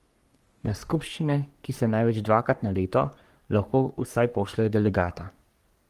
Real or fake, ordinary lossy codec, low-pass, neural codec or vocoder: fake; Opus, 24 kbps; 14.4 kHz; codec, 44.1 kHz, 3.4 kbps, Pupu-Codec